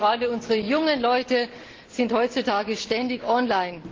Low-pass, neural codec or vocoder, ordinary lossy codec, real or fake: 7.2 kHz; none; Opus, 16 kbps; real